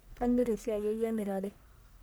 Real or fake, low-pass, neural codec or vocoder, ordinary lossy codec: fake; none; codec, 44.1 kHz, 1.7 kbps, Pupu-Codec; none